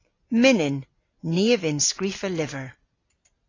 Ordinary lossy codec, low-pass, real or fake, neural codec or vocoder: AAC, 32 kbps; 7.2 kHz; real; none